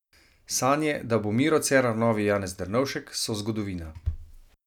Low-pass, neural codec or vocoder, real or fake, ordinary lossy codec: 19.8 kHz; none; real; none